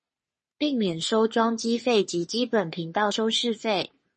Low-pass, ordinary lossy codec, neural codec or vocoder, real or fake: 10.8 kHz; MP3, 32 kbps; codec, 44.1 kHz, 3.4 kbps, Pupu-Codec; fake